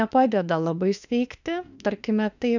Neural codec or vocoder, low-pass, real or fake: autoencoder, 48 kHz, 32 numbers a frame, DAC-VAE, trained on Japanese speech; 7.2 kHz; fake